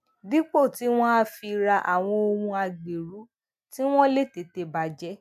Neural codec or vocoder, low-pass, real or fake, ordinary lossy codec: none; 14.4 kHz; real; MP3, 96 kbps